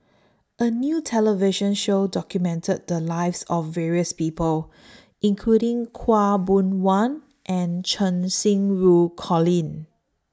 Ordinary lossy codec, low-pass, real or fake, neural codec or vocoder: none; none; real; none